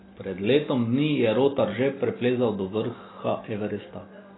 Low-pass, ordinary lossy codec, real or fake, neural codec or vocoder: 7.2 kHz; AAC, 16 kbps; real; none